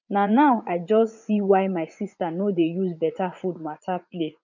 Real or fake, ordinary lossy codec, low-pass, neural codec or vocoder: fake; none; 7.2 kHz; vocoder, 44.1 kHz, 80 mel bands, Vocos